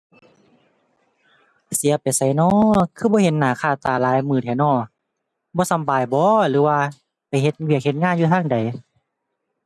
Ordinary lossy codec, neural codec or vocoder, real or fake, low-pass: none; none; real; none